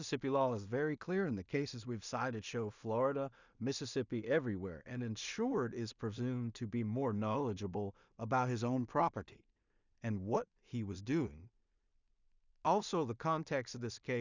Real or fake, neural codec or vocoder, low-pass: fake; codec, 16 kHz in and 24 kHz out, 0.4 kbps, LongCat-Audio-Codec, two codebook decoder; 7.2 kHz